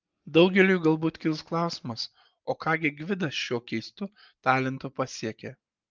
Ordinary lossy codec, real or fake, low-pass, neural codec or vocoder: Opus, 24 kbps; real; 7.2 kHz; none